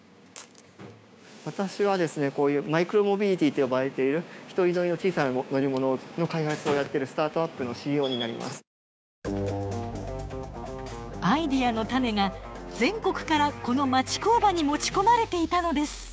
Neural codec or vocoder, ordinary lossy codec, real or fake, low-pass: codec, 16 kHz, 6 kbps, DAC; none; fake; none